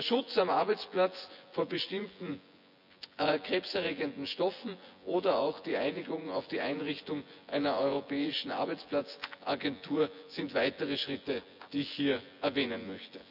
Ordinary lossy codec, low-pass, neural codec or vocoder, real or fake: none; 5.4 kHz; vocoder, 24 kHz, 100 mel bands, Vocos; fake